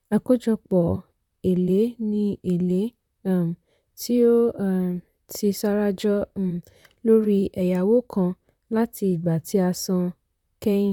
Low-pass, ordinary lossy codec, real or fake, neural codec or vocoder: 19.8 kHz; none; fake; vocoder, 44.1 kHz, 128 mel bands, Pupu-Vocoder